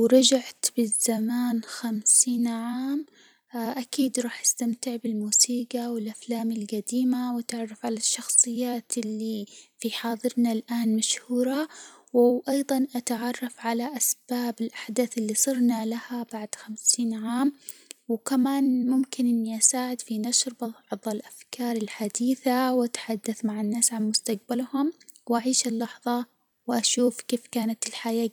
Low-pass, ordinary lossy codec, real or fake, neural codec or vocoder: none; none; fake; vocoder, 44.1 kHz, 128 mel bands every 256 samples, BigVGAN v2